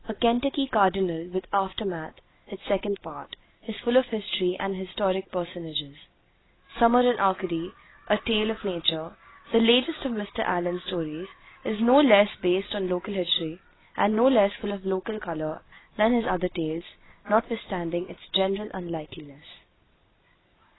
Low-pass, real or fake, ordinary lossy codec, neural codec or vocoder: 7.2 kHz; real; AAC, 16 kbps; none